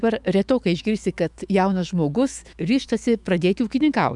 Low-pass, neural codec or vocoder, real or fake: 10.8 kHz; autoencoder, 48 kHz, 128 numbers a frame, DAC-VAE, trained on Japanese speech; fake